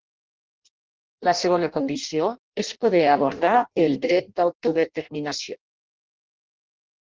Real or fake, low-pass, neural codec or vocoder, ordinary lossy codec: fake; 7.2 kHz; codec, 16 kHz in and 24 kHz out, 0.6 kbps, FireRedTTS-2 codec; Opus, 16 kbps